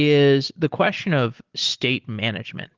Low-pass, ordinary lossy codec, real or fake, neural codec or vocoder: 7.2 kHz; Opus, 16 kbps; real; none